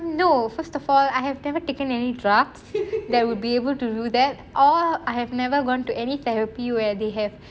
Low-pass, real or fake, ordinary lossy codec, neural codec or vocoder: none; real; none; none